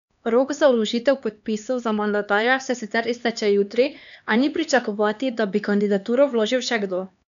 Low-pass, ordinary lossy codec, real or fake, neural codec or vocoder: 7.2 kHz; none; fake; codec, 16 kHz, 2 kbps, X-Codec, HuBERT features, trained on LibriSpeech